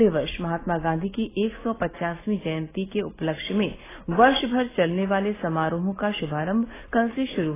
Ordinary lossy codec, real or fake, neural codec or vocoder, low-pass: AAC, 16 kbps; real; none; 3.6 kHz